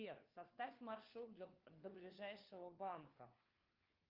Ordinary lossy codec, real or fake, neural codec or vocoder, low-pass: Opus, 24 kbps; fake; codec, 16 kHz, 4 kbps, FunCodec, trained on LibriTTS, 50 frames a second; 5.4 kHz